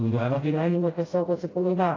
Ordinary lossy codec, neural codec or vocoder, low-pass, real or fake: AAC, 32 kbps; codec, 16 kHz, 0.5 kbps, FreqCodec, smaller model; 7.2 kHz; fake